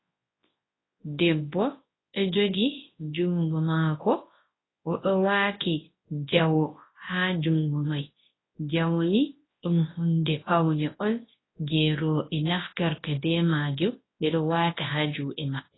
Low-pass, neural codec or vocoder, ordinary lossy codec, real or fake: 7.2 kHz; codec, 24 kHz, 0.9 kbps, WavTokenizer, large speech release; AAC, 16 kbps; fake